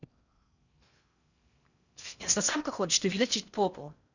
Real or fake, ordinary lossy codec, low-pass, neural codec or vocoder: fake; none; 7.2 kHz; codec, 16 kHz in and 24 kHz out, 0.6 kbps, FocalCodec, streaming, 4096 codes